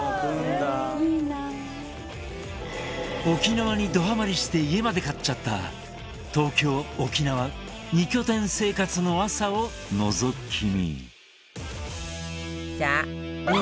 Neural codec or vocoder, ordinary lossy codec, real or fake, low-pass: none; none; real; none